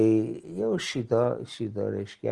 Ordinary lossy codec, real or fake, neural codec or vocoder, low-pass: Opus, 24 kbps; real; none; 10.8 kHz